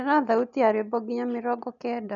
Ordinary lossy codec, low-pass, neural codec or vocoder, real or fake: none; 7.2 kHz; none; real